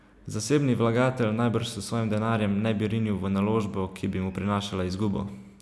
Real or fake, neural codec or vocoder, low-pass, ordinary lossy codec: real; none; none; none